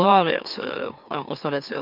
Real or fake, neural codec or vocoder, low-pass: fake; autoencoder, 44.1 kHz, a latent of 192 numbers a frame, MeloTTS; 5.4 kHz